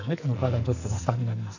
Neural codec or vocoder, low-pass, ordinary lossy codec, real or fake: codec, 44.1 kHz, 2.6 kbps, SNAC; 7.2 kHz; none; fake